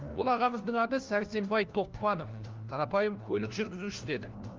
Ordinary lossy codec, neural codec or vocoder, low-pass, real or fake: Opus, 32 kbps; codec, 16 kHz, 1 kbps, FunCodec, trained on LibriTTS, 50 frames a second; 7.2 kHz; fake